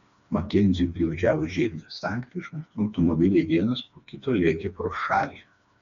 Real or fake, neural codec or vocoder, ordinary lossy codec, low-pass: fake; codec, 16 kHz, 2 kbps, FreqCodec, smaller model; MP3, 96 kbps; 7.2 kHz